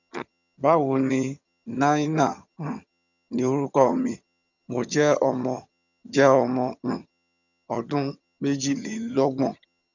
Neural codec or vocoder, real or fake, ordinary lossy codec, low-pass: vocoder, 22.05 kHz, 80 mel bands, HiFi-GAN; fake; none; 7.2 kHz